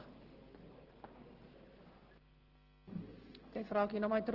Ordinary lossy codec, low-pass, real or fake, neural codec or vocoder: Opus, 16 kbps; 5.4 kHz; real; none